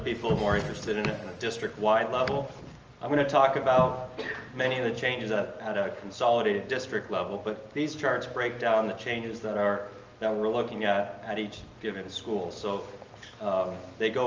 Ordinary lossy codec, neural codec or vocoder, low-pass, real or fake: Opus, 24 kbps; none; 7.2 kHz; real